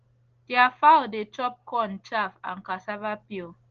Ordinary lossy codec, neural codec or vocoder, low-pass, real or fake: Opus, 16 kbps; none; 7.2 kHz; real